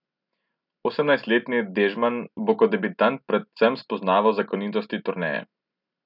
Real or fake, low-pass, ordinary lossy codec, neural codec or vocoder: real; 5.4 kHz; none; none